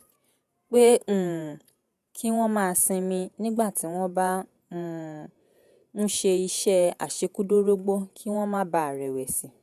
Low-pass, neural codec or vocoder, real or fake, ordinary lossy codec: 14.4 kHz; vocoder, 48 kHz, 128 mel bands, Vocos; fake; none